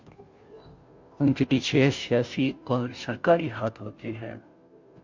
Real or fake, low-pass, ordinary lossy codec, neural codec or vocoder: fake; 7.2 kHz; MP3, 64 kbps; codec, 16 kHz, 0.5 kbps, FunCodec, trained on Chinese and English, 25 frames a second